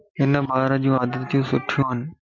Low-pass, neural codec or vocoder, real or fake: 7.2 kHz; none; real